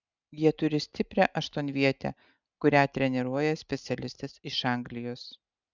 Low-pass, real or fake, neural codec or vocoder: 7.2 kHz; real; none